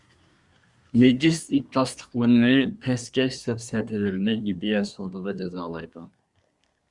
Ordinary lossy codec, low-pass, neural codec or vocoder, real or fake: Opus, 64 kbps; 10.8 kHz; codec, 24 kHz, 1 kbps, SNAC; fake